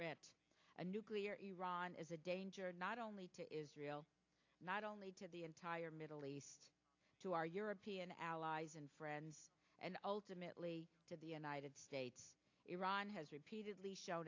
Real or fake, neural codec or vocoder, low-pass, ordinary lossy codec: real; none; 7.2 kHz; AAC, 48 kbps